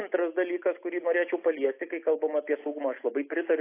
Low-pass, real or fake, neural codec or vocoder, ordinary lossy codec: 3.6 kHz; real; none; AAC, 24 kbps